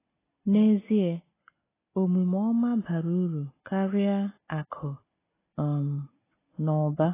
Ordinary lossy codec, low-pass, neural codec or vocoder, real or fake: AAC, 16 kbps; 3.6 kHz; none; real